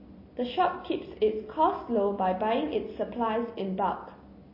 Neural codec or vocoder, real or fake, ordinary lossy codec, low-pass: none; real; MP3, 24 kbps; 5.4 kHz